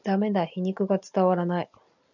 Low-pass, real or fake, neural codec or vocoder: 7.2 kHz; real; none